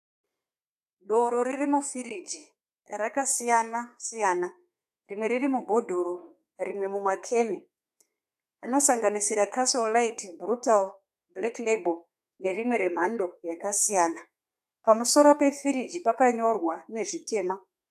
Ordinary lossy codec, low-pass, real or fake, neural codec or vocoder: AAC, 96 kbps; 14.4 kHz; fake; codec, 32 kHz, 1.9 kbps, SNAC